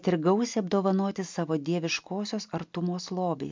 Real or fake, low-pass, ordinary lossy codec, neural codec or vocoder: real; 7.2 kHz; MP3, 64 kbps; none